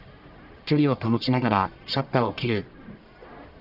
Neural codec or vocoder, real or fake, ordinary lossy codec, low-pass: codec, 44.1 kHz, 1.7 kbps, Pupu-Codec; fake; none; 5.4 kHz